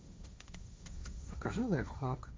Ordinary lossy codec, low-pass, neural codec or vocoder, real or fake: none; none; codec, 16 kHz, 1.1 kbps, Voila-Tokenizer; fake